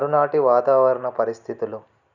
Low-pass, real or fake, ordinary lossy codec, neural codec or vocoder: 7.2 kHz; real; none; none